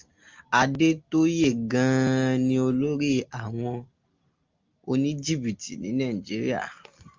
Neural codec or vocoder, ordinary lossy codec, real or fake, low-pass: none; Opus, 24 kbps; real; 7.2 kHz